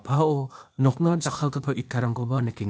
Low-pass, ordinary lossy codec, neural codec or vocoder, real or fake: none; none; codec, 16 kHz, 0.8 kbps, ZipCodec; fake